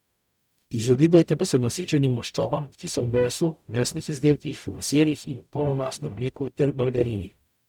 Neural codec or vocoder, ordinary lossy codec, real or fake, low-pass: codec, 44.1 kHz, 0.9 kbps, DAC; none; fake; 19.8 kHz